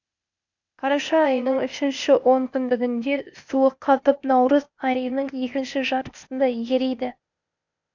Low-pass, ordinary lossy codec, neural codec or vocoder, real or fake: 7.2 kHz; none; codec, 16 kHz, 0.8 kbps, ZipCodec; fake